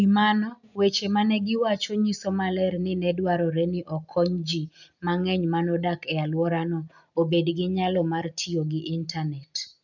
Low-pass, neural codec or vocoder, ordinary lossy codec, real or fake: 7.2 kHz; none; none; real